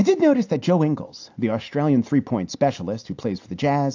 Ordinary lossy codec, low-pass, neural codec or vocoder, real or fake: AAC, 48 kbps; 7.2 kHz; none; real